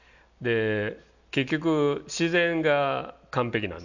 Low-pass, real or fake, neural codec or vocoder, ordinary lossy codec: 7.2 kHz; real; none; none